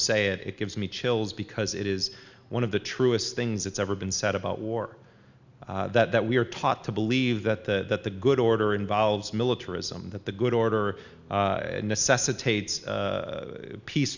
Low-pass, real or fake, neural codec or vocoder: 7.2 kHz; real; none